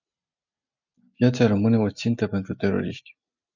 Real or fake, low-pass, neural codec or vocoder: real; 7.2 kHz; none